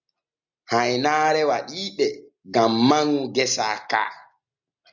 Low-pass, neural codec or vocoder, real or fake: 7.2 kHz; none; real